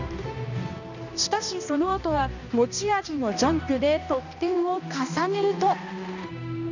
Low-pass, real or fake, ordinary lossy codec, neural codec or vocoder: 7.2 kHz; fake; none; codec, 16 kHz, 1 kbps, X-Codec, HuBERT features, trained on balanced general audio